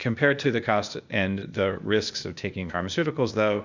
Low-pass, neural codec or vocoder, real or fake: 7.2 kHz; codec, 16 kHz, 0.8 kbps, ZipCodec; fake